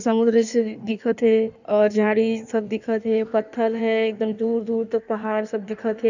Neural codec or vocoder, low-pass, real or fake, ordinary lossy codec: codec, 16 kHz in and 24 kHz out, 1.1 kbps, FireRedTTS-2 codec; 7.2 kHz; fake; none